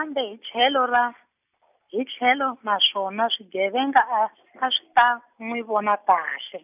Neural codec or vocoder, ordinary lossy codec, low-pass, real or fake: none; none; 3.6 kHz; real